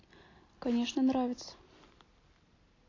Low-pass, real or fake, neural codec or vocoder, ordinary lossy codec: 7.2 kHz; real; none; AAC, 32 kbps